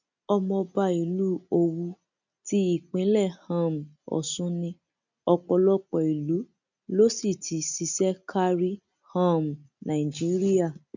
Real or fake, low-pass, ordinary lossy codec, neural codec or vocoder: real; 7.2 kHz; none; none